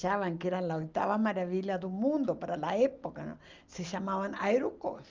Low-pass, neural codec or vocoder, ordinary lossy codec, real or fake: 7.2 kHz; none; Opus, 24 kbps; real